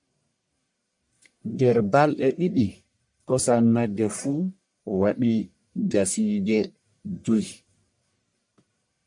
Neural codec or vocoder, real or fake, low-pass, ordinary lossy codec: codec, 44.1 kHz, 1.7 kbps, Pupu-Codec; fake; 10.8 kHz; MP3, 64 kbps